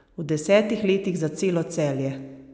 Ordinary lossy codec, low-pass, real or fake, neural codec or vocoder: none; none; real; none